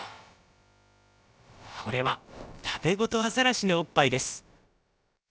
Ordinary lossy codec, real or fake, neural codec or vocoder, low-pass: none; fake; codec, 16 kHz, about 1 kbps, DyCAST, with the encoder's durations; none